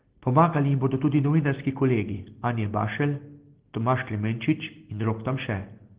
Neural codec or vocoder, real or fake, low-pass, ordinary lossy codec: none; real; 3.6 kHz; Opus, 16 kbps